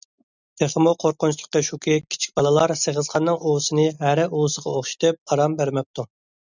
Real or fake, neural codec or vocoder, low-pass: real; none; 7.2 kHz